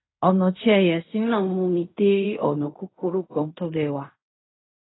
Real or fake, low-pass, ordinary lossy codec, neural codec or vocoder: fake; 7.2 kHz; AAC, 16 kbps; codec, 16 kHz in and 24 kHz out, 0.4 kbps, LongCat-Audio-Codec, fine tuned four codebook decoder